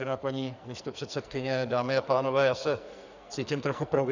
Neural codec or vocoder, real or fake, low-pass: codec, 44.1 kHz, 2.6 kbps, SNAC; fake; 7.2 kHz